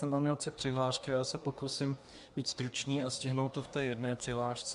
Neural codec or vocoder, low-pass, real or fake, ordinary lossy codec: codec, 24 kHz, 1 kbps, SNAC; 10.8 kHz; fake; MP3, 64 kbps